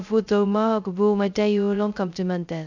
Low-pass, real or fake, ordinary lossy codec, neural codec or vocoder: 7.2 kHz; fake; none; codec, 16 kHz, 0.2 kbps, FocalCodec